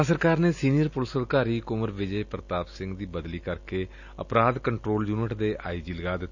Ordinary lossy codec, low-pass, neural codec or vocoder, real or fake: none; 7.2 kHz; none; real